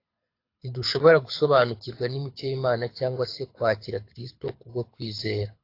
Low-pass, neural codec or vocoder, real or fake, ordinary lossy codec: 5.4 kHz; codec, 24 kHz, 6 kbps, HILCodec; fake; AAC, 32 kbps